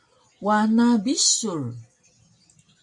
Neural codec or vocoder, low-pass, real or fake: none; 10.8 kHz; real